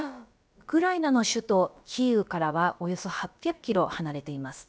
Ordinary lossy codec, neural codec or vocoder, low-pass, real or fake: none; codec, 16 kHz, about 1 kbps, DyCAST, with the encoder's durations; none; fake